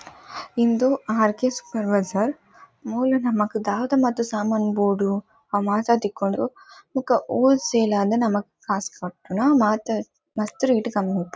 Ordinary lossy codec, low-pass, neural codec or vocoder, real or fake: none; none; none; real